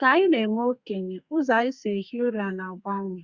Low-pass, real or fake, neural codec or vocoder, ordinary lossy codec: 7.2 kHz; fake; codec, 32 kHz, 1.9 kbps, SNAC; Opus, 64 kbps